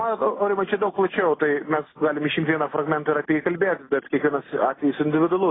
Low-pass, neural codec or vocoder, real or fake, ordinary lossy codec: 7.2 kHz; none; real; AAC, 16 kbps